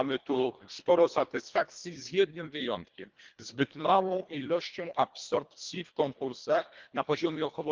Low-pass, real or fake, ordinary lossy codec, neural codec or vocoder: 7.2 kHz; fake; Opus, 32 kbps; codec, 24 kHz, 1.5 kbps, HILCodec